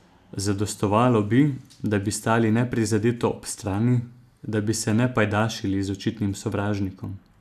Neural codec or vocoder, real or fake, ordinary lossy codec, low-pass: none; real; none; 14.4 kHz